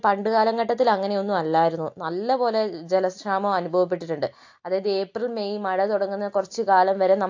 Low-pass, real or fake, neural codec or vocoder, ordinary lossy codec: 7.2 kHz; real; none; AAC, 48 kbps